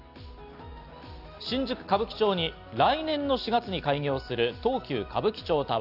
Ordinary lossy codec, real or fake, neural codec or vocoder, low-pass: Opus, 64 kbps; real; none; 5.4 kHz